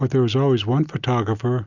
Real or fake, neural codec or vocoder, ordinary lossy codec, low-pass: real; none; Opus, 64 kbps; 7.2 kHz